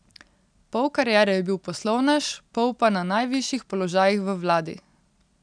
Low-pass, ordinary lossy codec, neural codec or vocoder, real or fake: 9.9 kHz; none; none; real